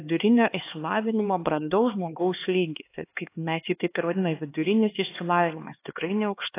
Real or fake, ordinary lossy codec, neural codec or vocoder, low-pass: fake; AAC, 24 kbps; codec, 16 kHz, 2 kbps, X-Codec, HuBERT features, trained on LibriSpeech; 3.6 kHz